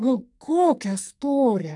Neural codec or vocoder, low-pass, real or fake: codec, 44.1 kHz, 2.6 kbps, SNAC; 10.8 kHz; fake